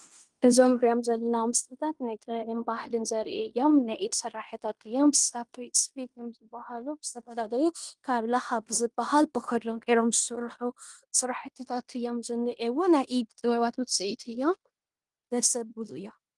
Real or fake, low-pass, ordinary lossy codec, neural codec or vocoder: fake; 10.8 kHz; Opus, 24 kbps; codec, 16 kHz in and 24 kHz out, 0.9 kbps, LongCat-Audio-Codec, fine tuned four codebook decoder